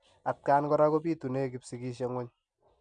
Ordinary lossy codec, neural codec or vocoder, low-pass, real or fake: none; none; 9.9 kHz; real